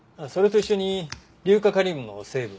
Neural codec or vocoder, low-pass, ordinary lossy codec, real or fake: none; none; none; real